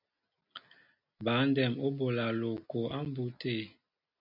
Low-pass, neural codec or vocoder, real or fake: 5.4 kHz; none; real